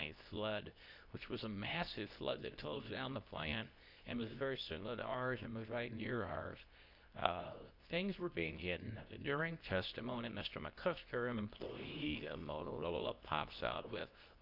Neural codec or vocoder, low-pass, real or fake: codec, 24 kHz, 0.9 kbps, WavTokenizer, medium speech release version 2; 5.4 kHz; fake